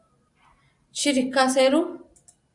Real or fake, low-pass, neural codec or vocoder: real; 10.8 kHz; none